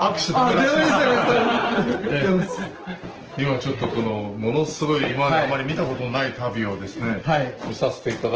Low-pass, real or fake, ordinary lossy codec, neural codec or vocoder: 7.2 kHz; real; Opus, 16 kbps; none